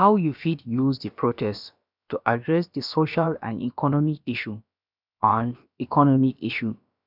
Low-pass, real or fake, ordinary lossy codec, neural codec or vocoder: 5.4 kHz; fake; none; codec, 16 kHz, about 1 kbps, DyCAST, with the encoder's durations